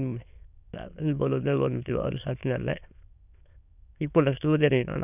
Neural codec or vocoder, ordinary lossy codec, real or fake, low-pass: autoencoder, 22.05 kHz, a latent of 192 numbers a frame, VITS, trained on many speakers; none; fake; 3.6 kHz